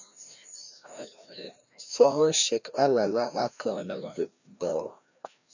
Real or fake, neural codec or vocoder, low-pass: fake; codec, 16 kHz, 1 kbps, FreqCodec, larger model; 7.2 kHz